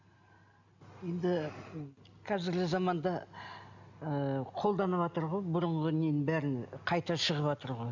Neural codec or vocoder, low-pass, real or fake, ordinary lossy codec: none; 7.2 kHz; real; none